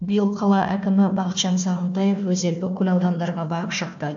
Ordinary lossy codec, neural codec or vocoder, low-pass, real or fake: AAC, 64 kbps; codec, 16 kHz, 1 kbps, FunCodec, trained on Chinese and English, 50 frames a second; 7.2 kHz; fake